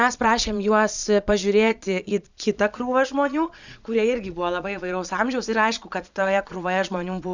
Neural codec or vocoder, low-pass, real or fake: vocoder, 22.05 kHz, 80 mel bands, Vocos; 7.2 kHz; fake